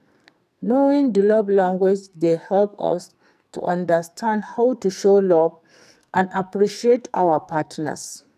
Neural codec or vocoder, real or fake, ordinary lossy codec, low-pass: codec, 44.1 kHz, 2.6 kbps, SNAC; fake; none; 14.4 kHz